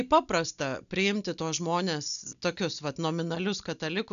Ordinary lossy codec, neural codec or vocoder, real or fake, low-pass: MP3, 96 kbps; none; real; 7.2 kHz